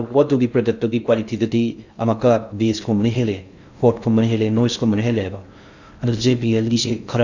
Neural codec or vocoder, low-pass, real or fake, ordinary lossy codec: codec, 16 kHz in and 24 kHz out, 0.6 kbps, FocalCodec, streaming, 2048 codes; 7.2 kHz; fake; none